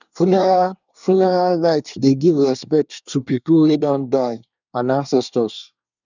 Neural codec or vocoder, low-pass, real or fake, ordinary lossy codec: codec, 24 kHz, 1 kbps, SNAC; 7.2 kHz; fake; none